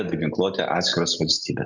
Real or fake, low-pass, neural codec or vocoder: real; 7.2 kHz; none